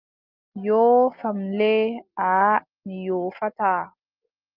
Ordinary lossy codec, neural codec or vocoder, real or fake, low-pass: Opus, 24 kbps; none; real; 5.4 kHz